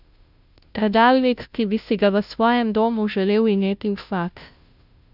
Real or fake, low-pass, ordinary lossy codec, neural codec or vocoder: fake; 5.4 kHz; none; codec, 16 kHz, 0.5 kbps, FunCodec, trained on Chinese and English, 25 frames a second